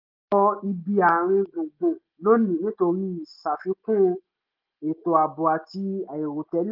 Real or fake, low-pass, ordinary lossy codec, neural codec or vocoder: real; 5.4 kHz; Opus, 32 kbps; none